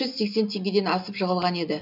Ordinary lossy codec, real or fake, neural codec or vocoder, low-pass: none; real; none; 5.4 kHz